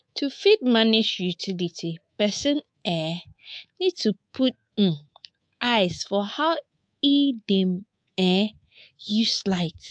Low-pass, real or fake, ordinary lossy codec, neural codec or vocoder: 9.9 kHz; fake; AAC, 64 kbps; codec, 24 kHz, 3.1 kbps, DualCodec